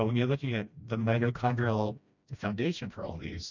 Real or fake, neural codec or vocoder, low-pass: fake; codec, 16 kHz, 1 kbps, FreqCodec, smaller model; 7.2 kHz